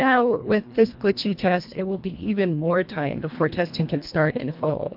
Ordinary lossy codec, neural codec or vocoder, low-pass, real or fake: MP3, 48 kbps; codec, 24 kHz, 1.5 kbps, HILCodec; 5.4 kHz; fake